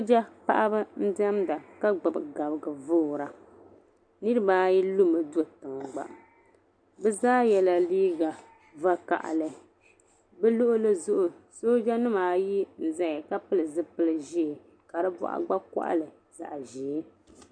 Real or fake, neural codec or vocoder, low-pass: real; none; 9.9 kHz